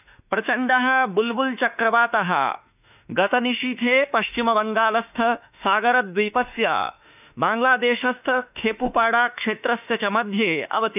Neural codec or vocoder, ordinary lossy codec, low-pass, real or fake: autoencoder, 48 kHz, 32 numbers a frame, DAC-VAE, trained on Japanese speech; none; 3.6 kHz; fake